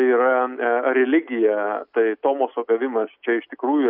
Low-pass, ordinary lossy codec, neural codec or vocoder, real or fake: 5.4 kHz; MP3, 48 kbps; none; real